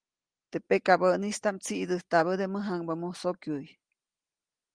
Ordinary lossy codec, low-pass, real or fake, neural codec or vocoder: Opus, 24 kbps; 9.9 kHz; real; none